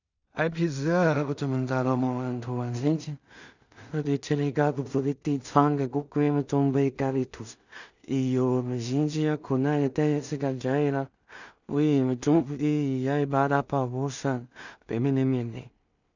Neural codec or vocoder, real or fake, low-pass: codec, 16 kHz in and 24 kHz out, 0.4 kbps, LongCat-Audio-Codec, two codebook decoder; fake; 7.2 kHz